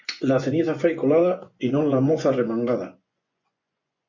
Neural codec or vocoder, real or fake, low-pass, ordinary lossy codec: vocoder, 24 kHz, 100 mel bands, Vocos; fake; 7.2 kHz; MP3, 64 kbps